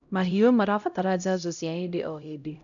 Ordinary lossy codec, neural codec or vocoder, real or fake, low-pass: none; codec, 16 kHz, 0.5 kbps, X-Codec, HuBERT features, trained on LibriSpeech; fake; 7.2 kHz